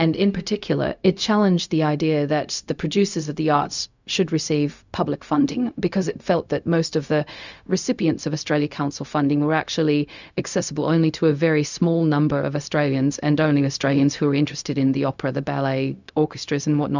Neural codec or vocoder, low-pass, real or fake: codec, 16 kHz, 0.4 kbps, LongCat-Audio-Codec; 7.2 kHz; fake